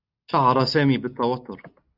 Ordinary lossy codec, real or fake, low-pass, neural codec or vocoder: Opus, 64 kbps; real; 5.4 kHz; none